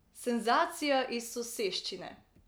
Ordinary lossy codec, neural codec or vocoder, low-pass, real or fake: none; none; none; real